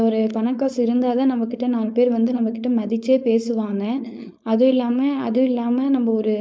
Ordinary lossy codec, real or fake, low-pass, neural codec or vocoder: none; fake; none; codec, 16 kHz, 4.8 kbps, FACodec